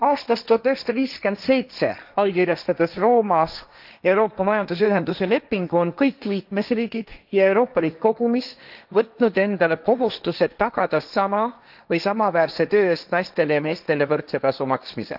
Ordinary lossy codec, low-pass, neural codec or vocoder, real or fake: none; 5.4 kHz; codec, 16 kHz, 1.1 kbps, Voila-Tokenizer; fake